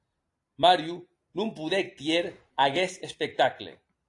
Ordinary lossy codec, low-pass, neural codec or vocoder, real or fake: AAC, 48 kbps; 10.8 kHz; none; real